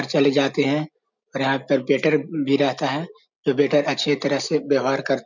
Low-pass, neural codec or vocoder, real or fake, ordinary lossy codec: 7.2 kHz; none; real; none